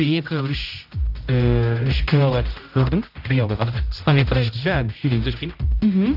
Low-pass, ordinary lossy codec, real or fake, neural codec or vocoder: 5.4 kHz; AAC, 48 kbps; fake; codec, 16 kHz, 0.5 kbps, X-Codec, HuBERT features, trained on general audio